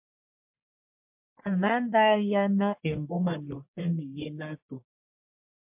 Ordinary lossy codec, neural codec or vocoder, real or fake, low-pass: MP3, 32 kbps; codec, 44.1 kHz, 1.7 kbps, Pupu-Codec; fake; 3.6 kHz